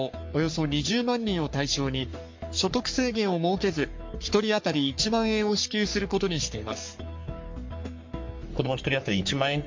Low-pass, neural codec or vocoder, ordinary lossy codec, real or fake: 7.2 kHz; codec, 44.1 kHz, 3.4 kbps, Pupu-Codec; MP3, 48 kbps; fake